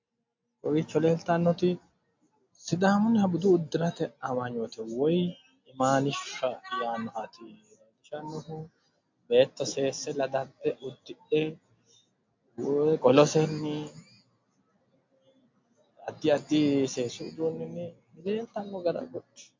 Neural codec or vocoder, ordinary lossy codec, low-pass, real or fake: none; MP3, 48 kbps; 7.2 kHz; real